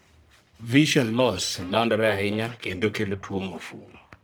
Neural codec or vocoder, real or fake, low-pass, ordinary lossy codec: codec, 44.1 kHz, 1.7 kbps, Pupu-Codec; fake; none; none